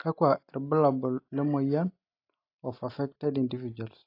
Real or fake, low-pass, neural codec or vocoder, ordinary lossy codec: real; 5.4 kHz; none; AAC, 32 kbps